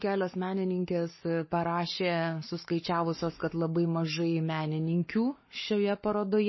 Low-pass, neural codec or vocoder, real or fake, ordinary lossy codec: 7.2 kHz; codec, 44.1 kHz, 7.8 kbps, Pupu-Codec; fake; MP3, 24 kbps